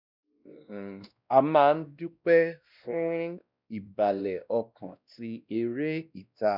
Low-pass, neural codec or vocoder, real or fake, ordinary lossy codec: 5.4 kHz; codec, 16 kHz, 1 kbps, X-Codec, WavLM features, trained on Multilingual LibriSpeech; fake; none